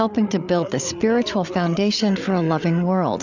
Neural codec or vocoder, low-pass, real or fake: codec, 16 kHz, 8 kbps, FreqCodec, larger model; 7.2 kHz; fake